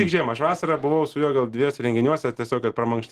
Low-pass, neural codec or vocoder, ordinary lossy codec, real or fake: 14.4 kHz; none; Opus, 16 kbps; real